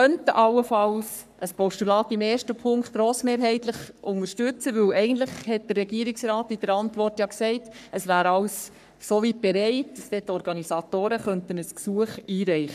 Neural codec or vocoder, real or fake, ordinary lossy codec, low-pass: codec, 44.1 kHz, 3.4 kbps, Pupu-Codec; fake; none; 14.4 kHz